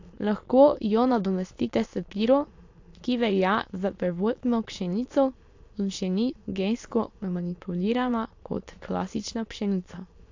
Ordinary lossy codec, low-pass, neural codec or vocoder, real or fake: AAC, 48 kbps; 7.2 kHz; autoencoder, 22.05 kHz, a latent of 192 numbers a frame, VITS, trained on many speakers; fake